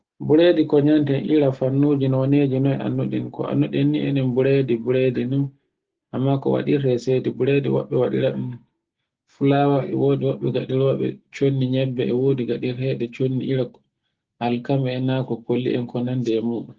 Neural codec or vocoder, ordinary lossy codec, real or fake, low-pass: none; Opus, 16 kbps; real; 7.2 kHz